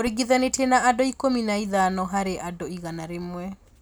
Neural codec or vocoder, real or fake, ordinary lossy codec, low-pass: none; real; none; none